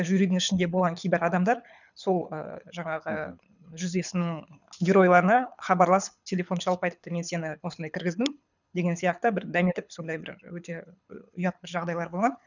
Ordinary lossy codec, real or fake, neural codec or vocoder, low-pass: none; fake; codec, 24 kHz, 6 kbps, HILCodec; 7.2 kHz